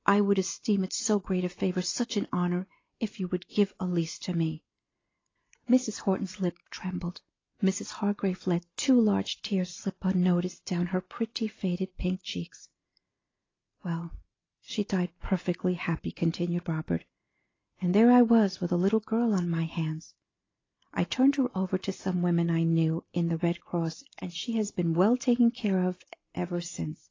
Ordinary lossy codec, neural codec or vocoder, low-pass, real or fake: AAC, 32 kbps; none; 7.2 kHz; real